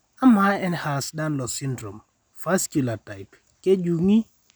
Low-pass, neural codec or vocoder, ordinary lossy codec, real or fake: none; none; none; real